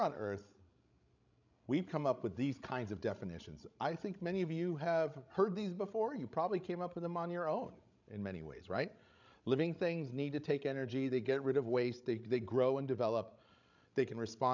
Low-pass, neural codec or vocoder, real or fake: 7.2 kHz; codec, 16 kHz, 16 kbps, FreqCodec, larger model; fake